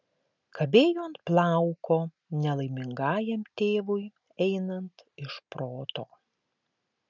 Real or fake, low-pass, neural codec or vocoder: real; 7.2 kHz; none